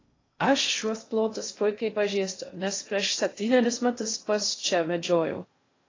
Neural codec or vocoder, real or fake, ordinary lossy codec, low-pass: codec, 16 kHz in and 24 kHz out, 0.6 kbps, FocalCodec, streaming, 2048 codes; fake; AAC, 32 kbps; 7.2 kHz